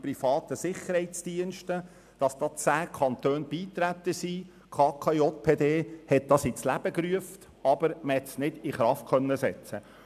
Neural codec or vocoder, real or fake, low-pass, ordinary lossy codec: none; real; 14.4 kHz; none